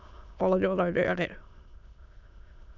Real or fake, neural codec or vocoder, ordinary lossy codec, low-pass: fake; autoencoder, 22.05 kHz, a latent of 192 numbers a frame, VITS, trained on many speakers; none; 7.2 kHz